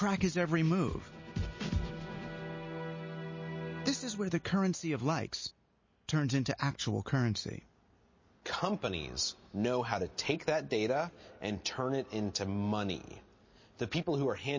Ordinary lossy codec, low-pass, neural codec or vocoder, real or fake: MP3, 32 kbps; 7.2 kHz; none; real